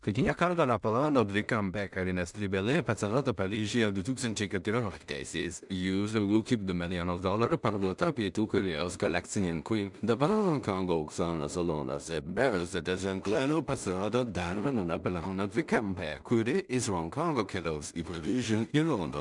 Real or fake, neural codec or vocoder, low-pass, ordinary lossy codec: fake; codec, 16 kHz in and 24 kHz out, 0.4 kbps, LongCat-Audio-Codec, two codebook decoder; 10.8 kHz; MP3, 96 kbps